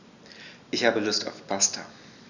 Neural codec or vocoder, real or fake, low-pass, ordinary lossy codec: none; real; 7.2 kHz; none